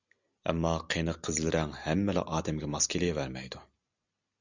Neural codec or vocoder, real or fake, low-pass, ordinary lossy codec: none; real; 7.2 kHz; Opus, 64 kbps